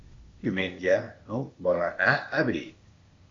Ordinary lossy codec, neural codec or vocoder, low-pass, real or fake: AAC, 32 kbps; codec, 16 kHz, 0.8 kbps, ZipCodec; 7.2 kHz; fake